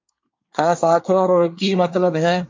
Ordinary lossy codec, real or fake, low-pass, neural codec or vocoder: MP3, 48 kbps; fake; 7.2 kHz; codec, 24 kHz, 1 kbps, SNAC